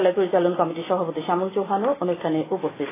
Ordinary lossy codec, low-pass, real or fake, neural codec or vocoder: AAC, 16 kbps; 3.6 kHz; real; none